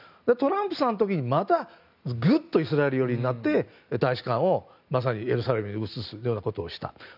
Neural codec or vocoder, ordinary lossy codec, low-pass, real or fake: none; none; 5.4 kHz; real